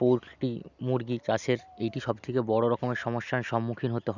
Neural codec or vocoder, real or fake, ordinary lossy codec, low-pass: none; real; none; 7.2 kHz